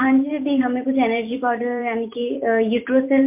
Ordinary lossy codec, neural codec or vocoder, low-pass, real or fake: MP3, 32 kbps; vocoder, 44.1 kHz, 128 mel bands every 256 samples, BigVGAN v2; 3.6 kHz; fake